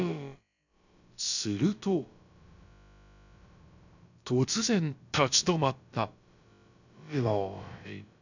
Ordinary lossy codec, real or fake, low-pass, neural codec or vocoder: none; fake; 7.2 kHz; codec, 16 kHz, about 1 kbps, DyCAST, with the encoder's durations